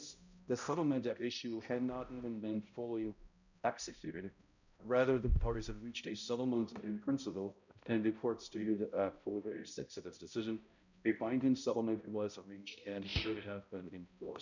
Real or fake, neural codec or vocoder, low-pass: fake; codec, 16 kHz, 0.5 kbps, X-Codec, HuBERT features, trained on balanced general audio; 7.2 kHz